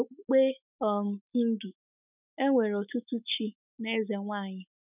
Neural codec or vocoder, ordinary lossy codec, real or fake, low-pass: autoencoder, 48 kHz, 128 numbers a frame, DAC-VAE, trained on Japanese speech; none; fake; 3.6 kHz